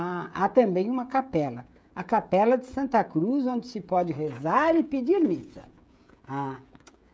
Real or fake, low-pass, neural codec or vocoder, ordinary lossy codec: fake; none; codec, 16 kHz, 16 kbps, FreqCodec, smaller model; none